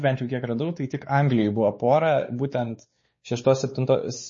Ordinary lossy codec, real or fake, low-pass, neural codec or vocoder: MP3, 32 kbps; fake; 7.2 kHz; codec, 16 kHz, 4 kbps, X-Codec, WavLM features, trained on Multilingual LibriSpeech